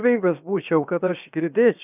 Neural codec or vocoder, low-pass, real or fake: codec, 16 kHz, 0.7 kbps, FocalCodec; 3.6 kHz; fake